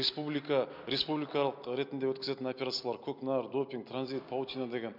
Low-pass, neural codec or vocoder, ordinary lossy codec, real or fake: 5.4 kHz; none; MP3, 48 kbps; real